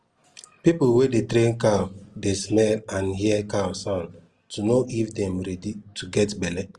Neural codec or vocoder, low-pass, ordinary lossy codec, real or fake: none; 10.8 kHz; Opus, 24 kbps; real